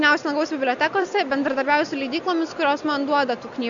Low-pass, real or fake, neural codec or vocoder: 7.2 kHz; real; none